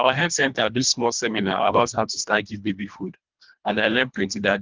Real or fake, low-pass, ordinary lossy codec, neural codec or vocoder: fake; 7.2 kHz; Opus, 32 kbps; codec, 24 kHz, 1.5 kbps, HILCodec